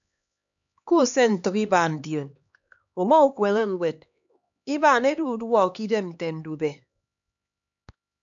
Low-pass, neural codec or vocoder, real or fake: 7.2 kHz; codec, 16 kHz, 2 kbps, X-Codec, HuBERT features, trained on LibriSpeech; fake